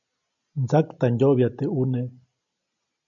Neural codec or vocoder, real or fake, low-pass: none; real; 7.2 kHz